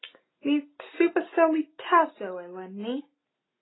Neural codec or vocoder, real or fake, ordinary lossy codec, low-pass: none; real; AAC, 16 kbps; 7.2 kHz